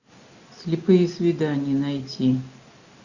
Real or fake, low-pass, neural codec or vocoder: real; 7.2 kHz; none